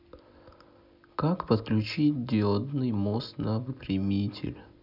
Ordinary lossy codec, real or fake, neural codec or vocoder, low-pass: none; real; none; 5.4 kHz